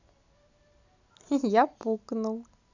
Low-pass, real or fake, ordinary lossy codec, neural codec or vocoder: 7.2 kHz; real; none; none